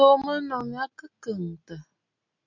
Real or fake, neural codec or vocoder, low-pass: real; none; 7.2 kHz